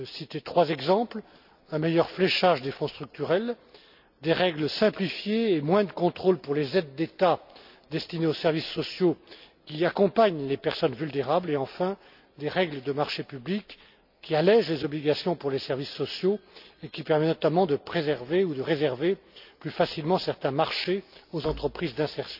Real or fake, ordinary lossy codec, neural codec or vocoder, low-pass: real; none; none; 5.4 kHz